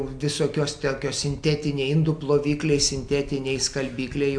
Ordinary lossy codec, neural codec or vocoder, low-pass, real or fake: AAC, 64 kbps; none; 9.9 kHz; real